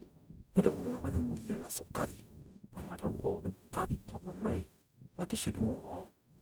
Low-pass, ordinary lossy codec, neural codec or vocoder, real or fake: none; none; codec, 44.1 kHz, 0.9 kbps, DAC; fake